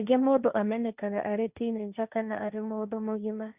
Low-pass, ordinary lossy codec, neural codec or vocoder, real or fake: 3.6 kHz; Opus, 64 kbps; codec, 16 kHz, 1.1 kbps, Voila-Tokenizer; fake